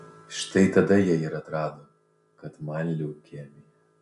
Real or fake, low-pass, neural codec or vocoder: real; 10.8 kHz; none